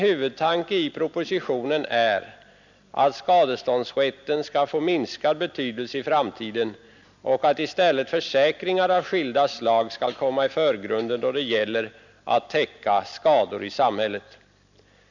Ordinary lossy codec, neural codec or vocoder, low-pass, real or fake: none; none; 7.2 kHz; real